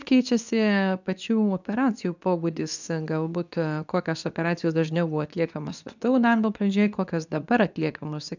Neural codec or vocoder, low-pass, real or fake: codec, 24 kHz, 0.9 kbps, WavTokenizer, medium speech release version 2; 7.2 kHz; fake